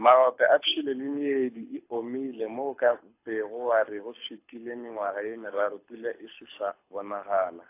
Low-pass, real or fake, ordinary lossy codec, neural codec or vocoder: 3.6 kHz; fake; AAC, 24 kbps; codec, 24 kHz, 6 kbps, HILCodec